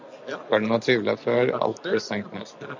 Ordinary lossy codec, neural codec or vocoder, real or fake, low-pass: MP3, 64 kbps; none; real; 7.2 kHz